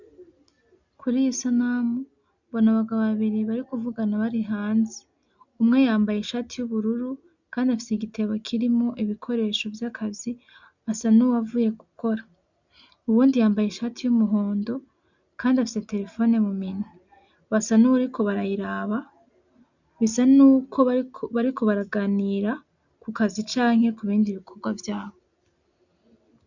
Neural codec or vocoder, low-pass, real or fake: none; 7.2 kHz; real